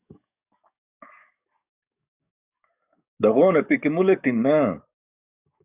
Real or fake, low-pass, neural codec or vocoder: fake; 3.6 kHz; codec, 44.1 kHz, 7.8 kbps, DAC